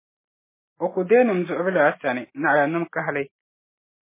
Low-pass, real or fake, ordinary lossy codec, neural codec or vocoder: 3.6 kHz; real; MP3, 16 kbps; none